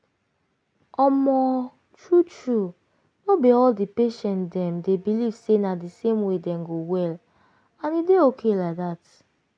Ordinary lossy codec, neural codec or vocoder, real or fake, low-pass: none; none; real; 9.9 kHz